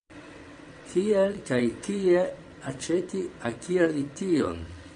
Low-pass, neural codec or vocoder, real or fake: 9.9 kHz; vocoder, 22.05 kHz, 80 mel bands, WaveNeXt; fake